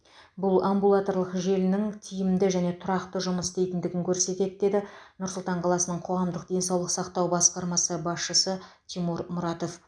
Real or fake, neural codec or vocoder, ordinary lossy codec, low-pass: real; none; none; 9.9 kHz